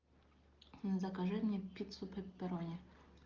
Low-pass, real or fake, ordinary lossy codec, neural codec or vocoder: 7.2 kHz; real; Opus, 32 kbps; none